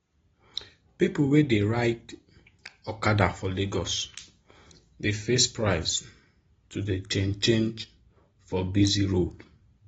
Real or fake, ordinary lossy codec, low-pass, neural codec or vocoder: real; AAC, 24 kbps; 19.8 kHz; none